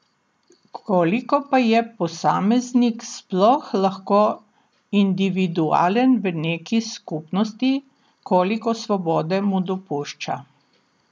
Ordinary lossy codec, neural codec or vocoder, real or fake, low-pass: none; none; real; none